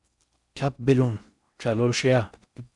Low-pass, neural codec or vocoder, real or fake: 10.8 kHz; codec, 16 kHz in and 24 kHz out, 0.6 kbps, FocalCodec, streaming, 4096 codes; fake